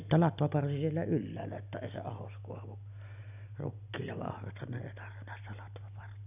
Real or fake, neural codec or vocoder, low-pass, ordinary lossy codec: real; none; 3.6 kHz; none